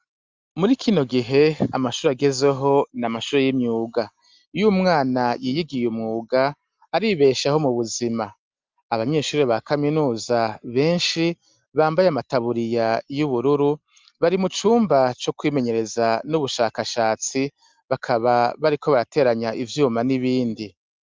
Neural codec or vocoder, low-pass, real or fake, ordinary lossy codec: none; 7.2 kHz; real; Opus, 32 kbps